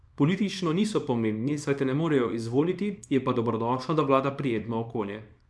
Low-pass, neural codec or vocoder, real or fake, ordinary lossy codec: none; codec, 24 kHz, 0.9 kbps, WavTokenizer, small release; fake; none